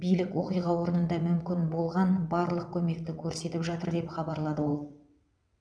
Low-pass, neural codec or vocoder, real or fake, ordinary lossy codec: 9.9 kHz; vocoder, 22.05 kHz, 80 mel bands, WaveNeXt; fake; none